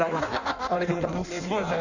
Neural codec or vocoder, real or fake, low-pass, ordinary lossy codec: codec, 16 kHz in and 24 kHz out, 1.1 kbps, FireRedTTS-2 codec; fake; 7.2 kHz; none